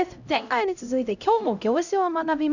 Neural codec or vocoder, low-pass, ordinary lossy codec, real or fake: codec, 16 kHz, 0.5 kbps, X-Codec, HuBERT features, trained on LibriSpeech; 7.2 kHz; none; fake